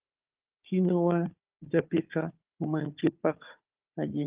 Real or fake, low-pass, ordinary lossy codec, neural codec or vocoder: fake; 3.6 kHz; Opus, 32 kbps; codec, 16 kHz, 4 kbps, FunCodec, trained on Chinese and English, 50 frames a second